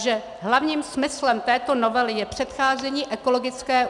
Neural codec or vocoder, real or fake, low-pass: none; real; 10.8 kHz